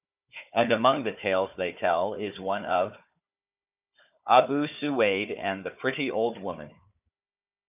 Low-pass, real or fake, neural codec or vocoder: 3.6 kHz; fake; codec, 16 kHz, 4 kbps, FunCodec, trained on Chinese and English, 50 frames a second